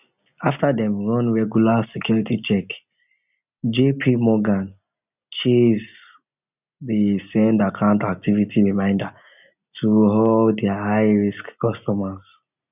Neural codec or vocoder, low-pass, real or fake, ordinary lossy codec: none; 3.6 kHz; real; none